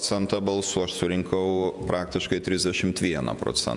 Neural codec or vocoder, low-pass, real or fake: vocoder, 48 kHz, 128 mel bands, Vocos; 10.8 kHz; fake